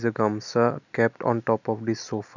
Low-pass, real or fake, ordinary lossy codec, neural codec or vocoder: 7.2 kHz; real; none; none